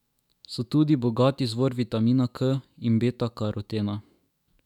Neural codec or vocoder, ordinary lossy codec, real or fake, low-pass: autoencoder, 48 kHz, 128 numbers a frame, DAC-VAE, trained on Japanese speech; none; fake; 19.8 kHz